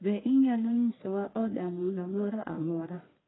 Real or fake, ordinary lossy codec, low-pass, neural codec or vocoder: fake; AAC, 16 kbps; 7.2 kHz; codec, 44.1 kHz, 1.7 kbps, Pupu-Codec